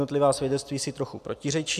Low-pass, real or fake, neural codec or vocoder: 14.4 kHz; real; none